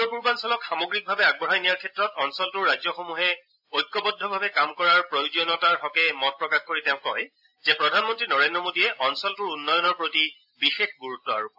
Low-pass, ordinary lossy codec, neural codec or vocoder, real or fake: 5.4 kHz; AAC, 48 kbps; none; real